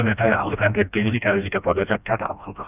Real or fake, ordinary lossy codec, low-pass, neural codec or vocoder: fake; none; 3.6 kHz; codec, 16 kHz, 1 kbps, FreqCodec, smaller model